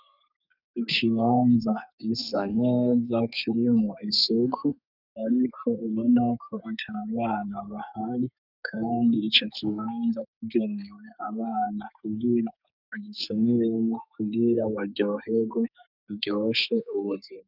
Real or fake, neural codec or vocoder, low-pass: fake; codec, 32 kHz, 1.9 kbps, SNAC; 5.4 kHz